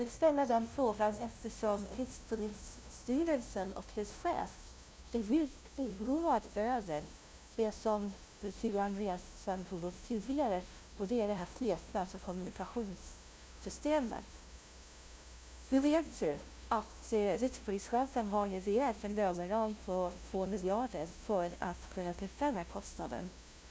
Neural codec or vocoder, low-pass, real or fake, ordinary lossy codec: codec, 16 kHz, 0.5 kbps, FunCodec, trained on LibriTTS, 25 frames a second; none; fake; none